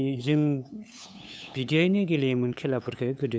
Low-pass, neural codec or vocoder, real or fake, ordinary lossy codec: none; codec, 16 kHz, 8 kbps, FunCodec, trained on LibriTTS, 25 frames a second; fake; none